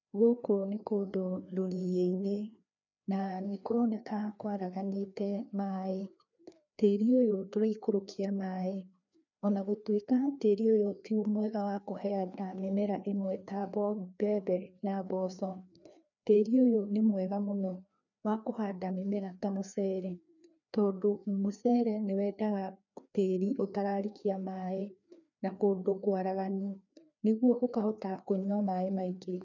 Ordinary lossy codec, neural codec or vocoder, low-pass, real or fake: none; codec, 16 kHz, 2 kbps, FreqCodec, larger model; 7.2 kHz; fake